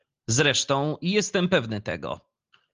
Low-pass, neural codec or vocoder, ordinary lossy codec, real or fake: 7.2 kHz; none; Opus, 32 kbps; real